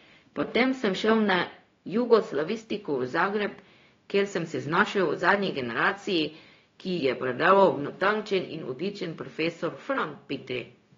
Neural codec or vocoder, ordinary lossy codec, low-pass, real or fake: codec, 16 kHz, 0.4 kbps, LongCat-Audio-Codec; AAC, 32 kbps; 7.2 kHz; fake